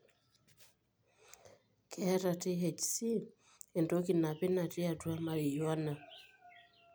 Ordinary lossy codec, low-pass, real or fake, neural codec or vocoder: none; none; real; none